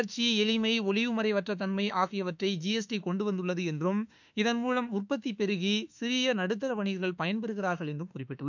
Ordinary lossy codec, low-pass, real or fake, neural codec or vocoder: none; 7.2 kHz; fake; autoencoder, 48 kHz, 32 numbers a frame, DAC-VAE, trained on Japanese speech